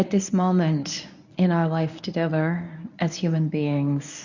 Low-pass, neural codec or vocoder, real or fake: 7.2 kHz; codec, 24 kHz, 0.9 kbps, WavTokenizer, medium speech release version 1; fake